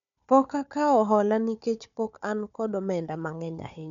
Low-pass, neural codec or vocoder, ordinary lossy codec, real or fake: 7.2 kHz; codec, 16 kHz, 4 kbps, FunCodec, trained on Chinese and English, 50 frames a second; none; fake